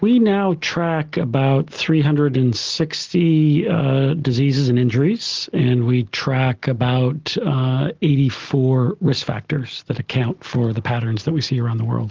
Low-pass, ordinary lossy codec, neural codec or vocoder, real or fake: 7.2 kHz; Opus, 16 kbps; none; real